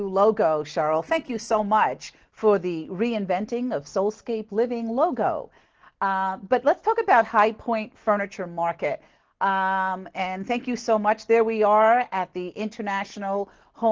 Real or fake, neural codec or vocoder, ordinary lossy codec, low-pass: real; none; Opus, 16 kbps; 7.2 kHz